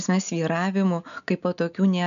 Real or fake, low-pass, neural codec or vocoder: real; 7.2 kHz; none